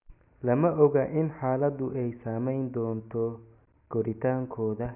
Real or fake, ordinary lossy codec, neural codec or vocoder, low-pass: real; none; none; 3.6 kHz